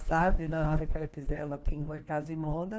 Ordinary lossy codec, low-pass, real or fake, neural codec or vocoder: none; none; fake; codec, 16 kHz, 1 kbps, FunCodec, trained on LibriTTS, 50 frames a second